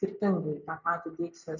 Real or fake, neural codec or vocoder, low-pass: real; none; 7.2 kHz